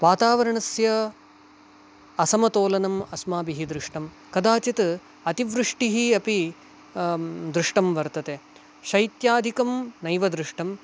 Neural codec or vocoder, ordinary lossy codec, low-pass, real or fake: none; none; none; real